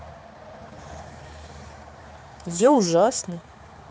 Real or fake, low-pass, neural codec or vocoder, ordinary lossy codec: fake; none; codec, 16 kHz, 4 kbps, X-Codec, HuBERT features, trained on balanced general audio; none